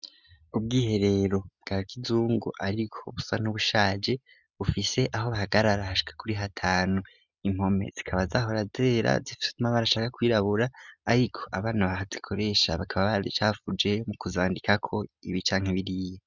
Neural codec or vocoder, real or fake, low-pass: none; real; 7.2 kHz